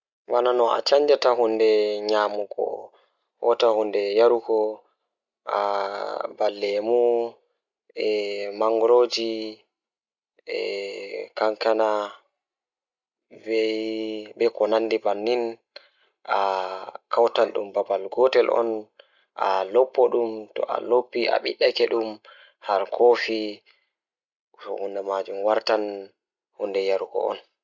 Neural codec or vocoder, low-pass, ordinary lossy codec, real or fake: none; 7.2 kHz; Opus, 64 kbps; real